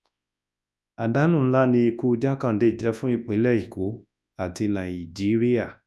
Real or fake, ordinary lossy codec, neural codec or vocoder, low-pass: fake; none; codec, 24 kHz, 0.9 kbps, WavTokenizer, large speech release; none